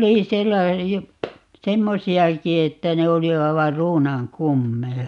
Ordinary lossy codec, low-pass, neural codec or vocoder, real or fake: none; 10.8 kHz; none; real